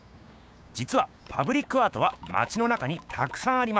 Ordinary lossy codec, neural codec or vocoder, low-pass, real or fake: none; codec, 16 kHz, 6 kbps, DAC; none; fake